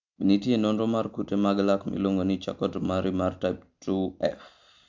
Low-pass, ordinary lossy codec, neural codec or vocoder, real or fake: 7.2 kHz; MP3, 64 kbps; none; real